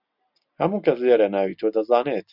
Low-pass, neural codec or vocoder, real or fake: 5.4 kHz; none; real